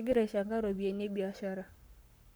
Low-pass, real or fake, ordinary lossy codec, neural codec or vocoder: none; fake; none; codec, 44.1 kHz, 7.8 kbps, Pupu-Codec